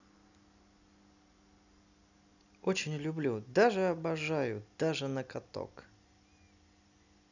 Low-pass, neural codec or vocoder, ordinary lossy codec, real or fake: 7.2 kHz; none; none; real